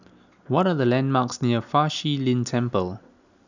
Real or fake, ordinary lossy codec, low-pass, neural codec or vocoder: real; none; 7.2 kHz; none